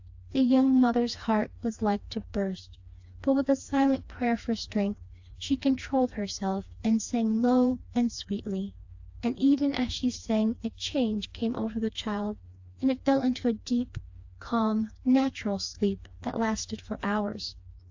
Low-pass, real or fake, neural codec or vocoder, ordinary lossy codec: 7.2 kHz; fake; codec, 16 kHz, 2 kbps, FreqCodec, smaller model; AAC, 48 kbps